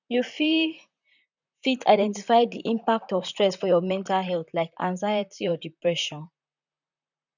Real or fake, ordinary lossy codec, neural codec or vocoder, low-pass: fake; none; vocoder, 44.1 kHz, 128 mel bands, Pupu-Vocoder; 7.2 kHz